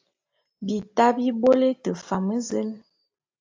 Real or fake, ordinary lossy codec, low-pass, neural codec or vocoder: real; AAC, 48 kbps; 7.2 kHz; none